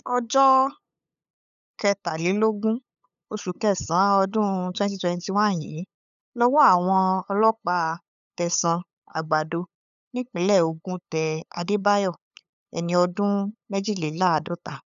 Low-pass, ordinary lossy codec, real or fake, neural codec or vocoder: 7.2 kHz; none; fake; codec, 16 kHz, 8 kbps, FunCodec, trained on LibriTTS, 25 frames a second